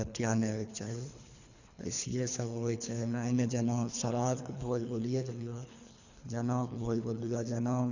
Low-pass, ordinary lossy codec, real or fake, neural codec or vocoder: 7.2 kHz; none; fake; codec, 24 kHz, 3 kbps, HILCodec